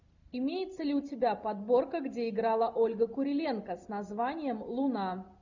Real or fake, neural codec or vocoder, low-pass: real; none; 7.2 kHz